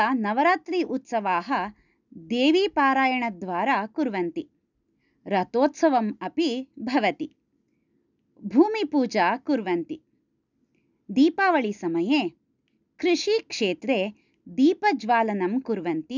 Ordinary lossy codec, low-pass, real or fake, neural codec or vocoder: none; 7.2 kHz; real; none